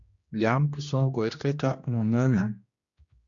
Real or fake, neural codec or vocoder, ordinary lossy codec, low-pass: fake; codec, 16 kHz, 1 kbps, X-Codec, HuBERT features, trained on general audio; Opus, 64 kbps; 7.2 kHz